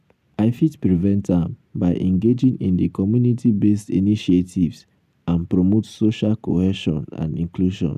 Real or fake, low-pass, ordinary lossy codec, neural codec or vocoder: real; 14.4 kHz; none; none